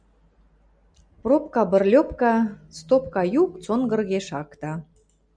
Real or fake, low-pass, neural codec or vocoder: real; 9.9 kHz; none